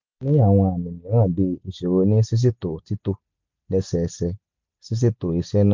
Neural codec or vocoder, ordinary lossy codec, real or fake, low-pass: none; MP3, 64 kbps; real; 7.2 kHz